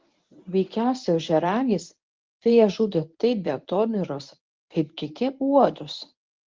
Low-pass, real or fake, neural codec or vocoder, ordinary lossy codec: 7.2 kHz; fake; codec, 24 kHz, 0.9 kbps, WavTokenizer, medium speech release version 1; Opus, 32 kbps